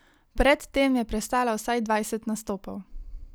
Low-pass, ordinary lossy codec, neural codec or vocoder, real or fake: none; none; none; real